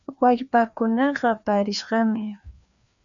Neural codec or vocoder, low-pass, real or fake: codec, 16 kHz, 2 kbps, FreqCodec, larger model; 7.2 kHz; fake